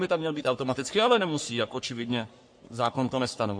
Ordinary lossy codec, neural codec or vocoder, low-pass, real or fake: MP3, 48 kbps; codec, 44.1 kHz, 3.4 kbps, Pupu-Codec; 9.9 kHz; fake